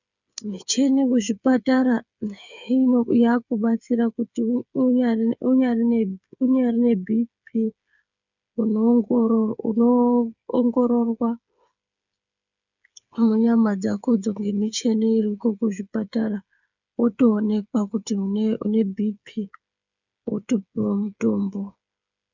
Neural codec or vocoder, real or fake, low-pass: codec, 16 kHz, 8 kbps, FreqCodec, smaller model; fake; 7.2 kHz